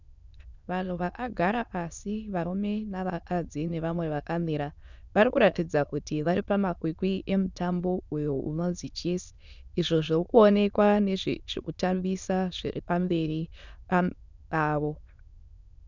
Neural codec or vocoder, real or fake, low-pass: autoencoder, 22.05 kHz, a latent of 192 numbers a frame, VITS, trained on many speakers; fake; 7.2 kHz